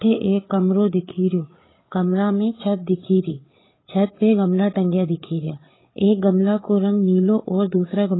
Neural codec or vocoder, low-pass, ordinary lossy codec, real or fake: codec, 16 kHz, 8 kbps, FreqCodec, larger model; 7.2 kHz; AAC, 16 kbps; fake